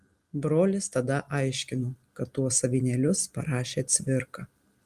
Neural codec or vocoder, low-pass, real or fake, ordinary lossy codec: none; 14.4 kHz; real; Opus, 32 kbps